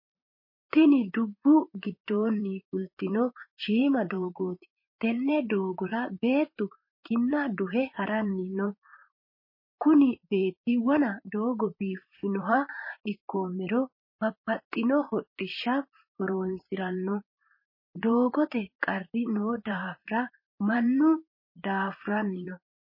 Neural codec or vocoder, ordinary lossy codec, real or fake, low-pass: vocoder, 44.1 kHz, 128 mel bands, Pupu-Vocoder; MP3, 24 kbps; fake; 5.4 kHz